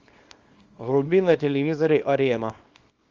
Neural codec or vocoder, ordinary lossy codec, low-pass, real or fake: codec, 24 kHz, 0.9 kbps, WavTokenizer, small release; Opus, 32 kbps; 7.2 kHz; fake